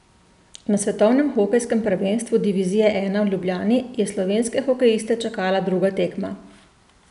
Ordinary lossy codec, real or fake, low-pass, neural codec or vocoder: none; real; 10.8 kHz; none